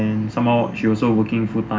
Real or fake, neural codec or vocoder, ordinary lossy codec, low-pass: real; none; none; none